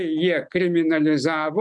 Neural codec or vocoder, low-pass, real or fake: none; 10.8 kHz; real